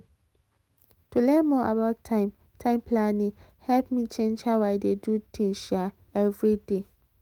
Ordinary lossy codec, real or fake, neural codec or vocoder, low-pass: Opus, 32 kbps; fake; autoencoder, 48 kHz, 128 numbers a frame, DAC-VAE, trained on Japanese speech; 19.8 kHz